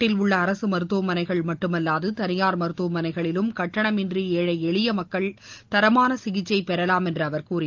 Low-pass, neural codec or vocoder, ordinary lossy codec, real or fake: 7.2 kHz; none; Opus, 32 kbps; real